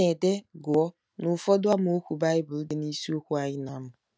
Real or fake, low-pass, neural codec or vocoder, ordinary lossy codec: real; none; none; none